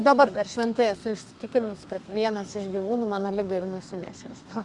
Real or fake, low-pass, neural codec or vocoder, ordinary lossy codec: fake; 10.8 kHz; codec, 32 kHz, 1.9 kbps, SNAC; Opus, 64 kbps